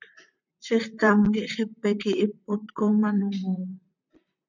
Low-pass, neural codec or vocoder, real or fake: 7.2 kHz; vocoder, 44.1 kHz, 128 mel bands, Pupu-Vocoder; fake